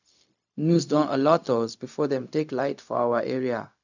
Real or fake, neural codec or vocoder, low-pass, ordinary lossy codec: fake; codec, 16 kHz, 0.4 kbps, LongCat-Audio-Codec; 7.2 kHz; none